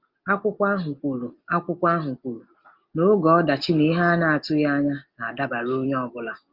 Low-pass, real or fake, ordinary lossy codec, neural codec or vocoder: 5.4 kHz; real; Opus, 24 kbps; none